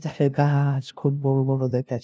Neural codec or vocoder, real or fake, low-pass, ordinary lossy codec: codec, 16 kHz, 0.5 kbps, FunCodec, trained on LibriTTS, 25 frames a second; fake; none; none